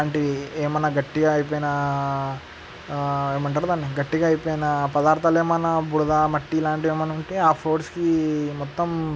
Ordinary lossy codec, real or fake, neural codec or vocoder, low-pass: none; real; none; none